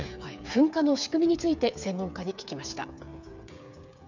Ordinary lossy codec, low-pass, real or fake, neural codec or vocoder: none; 7.2 kHz; fake; codec, 16 kHz, 8 kbps, FreqCodec, smaller model